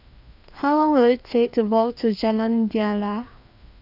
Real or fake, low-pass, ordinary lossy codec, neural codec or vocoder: fake; 5.4 kHz; none; codec, 16 kHz, 2 kbps, FreqCodec, larger model